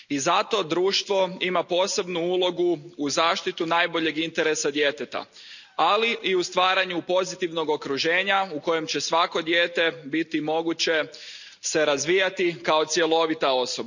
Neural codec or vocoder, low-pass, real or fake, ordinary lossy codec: none; 7.2 kHz; real; none